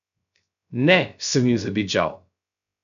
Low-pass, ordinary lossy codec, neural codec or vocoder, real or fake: 7.2 kHz; none; codec, 16 kHz, 0.3 kbps, FocalCodec; fake